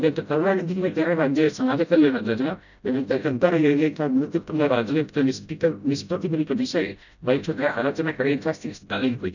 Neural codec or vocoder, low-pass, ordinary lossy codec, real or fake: codec, 16 kHz, 0.5 kbps, FreqCodec, smaller model; 7.2 kHz; none; fake